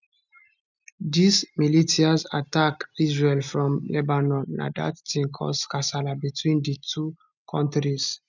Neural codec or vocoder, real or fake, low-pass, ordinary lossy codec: none; real; 7.2 kHz; none